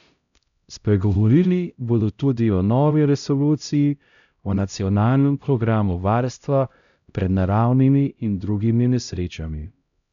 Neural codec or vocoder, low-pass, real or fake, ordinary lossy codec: codec, 16 kHz, 0.5 kbps, X-Codec, HuBERT features, trained on LibriSpeech; 7.2 kHz; fake; none